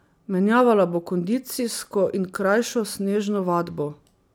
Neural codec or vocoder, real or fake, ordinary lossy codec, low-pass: none; real; none; none